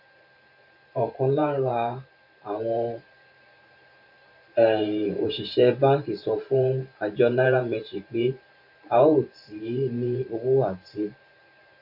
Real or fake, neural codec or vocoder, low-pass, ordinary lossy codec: fake; vocoder, 44.1 kHz, 128 mel bands every 512 samples, BigVGAN v2; 5.4 kHz; none